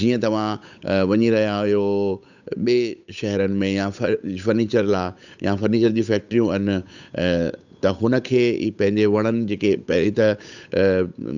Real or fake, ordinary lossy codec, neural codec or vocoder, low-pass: fake; none; codec, 16 kHz, 8 kbps, FunCodec, trained on Chinese and English, 25 frames a second; 7.2 kHz